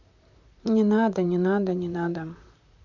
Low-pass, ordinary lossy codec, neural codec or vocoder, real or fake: 7.2 kHz; none; vocoder, 44.1 kHz, 128 mel bands, Pupu-Vocoder; fake